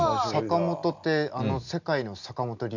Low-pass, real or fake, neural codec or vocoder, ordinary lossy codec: 7.2 kHz; real; none; none